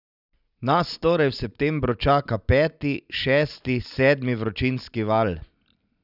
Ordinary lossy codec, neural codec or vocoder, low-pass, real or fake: none; none; 5.4 kHz; real